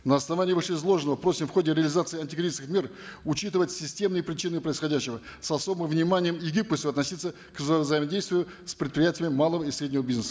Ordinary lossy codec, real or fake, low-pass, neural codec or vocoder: none; real; none; none